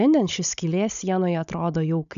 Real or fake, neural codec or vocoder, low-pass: fake; codec, 16 kHz, 16 kbps, FunCodec, trained on Chinese and English, 50 frames a second; 7.2 kHz